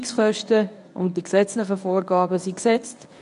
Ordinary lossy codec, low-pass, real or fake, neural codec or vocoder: none; 10.8 kHz; fake; codec, 24 kHz, 0.9 kbps, WavTokenizer, medium speech release version 1